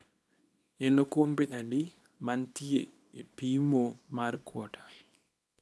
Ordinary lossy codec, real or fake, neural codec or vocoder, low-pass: none; fake; codec, 24 kHz, 0.9 kbps, WavTokenizer, small release; none